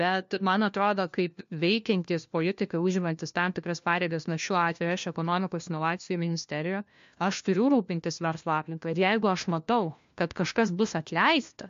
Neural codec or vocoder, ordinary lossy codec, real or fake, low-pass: codec, 16 kHz, 1 kbps, FunCodec, trained on LibriTTS, 50 frames a second; MP3, 48 kbps; fake; 7.2 kHz